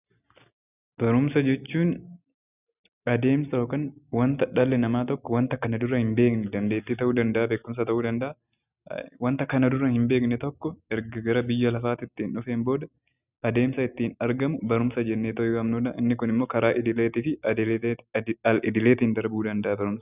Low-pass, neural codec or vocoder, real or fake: 3.6 kHz; none; real